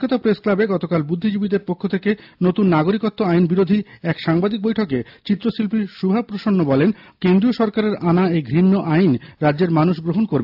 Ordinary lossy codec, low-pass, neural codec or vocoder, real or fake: AAC, 48 kbps; 5.4 kHz; none; real